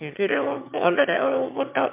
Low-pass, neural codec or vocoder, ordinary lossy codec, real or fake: 3.6 kHz; autoencoder, 22.05 kHz, a latent of 192 numbers a frame, VITS, trained on one speaker; MP3, 32 kbps; fake